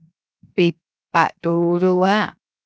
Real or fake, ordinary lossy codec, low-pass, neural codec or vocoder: fake; none; none; codec, 16 kHz, 0.7 kbps, FocalCodec